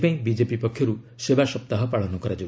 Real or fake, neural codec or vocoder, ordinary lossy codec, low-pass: real; none; none; none